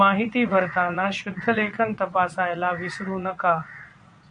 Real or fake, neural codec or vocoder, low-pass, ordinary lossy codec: fake; vocoder, 22.05 kHz, 80 mel bands, Vocos; 9.9 kHz; MP3, 96 kbps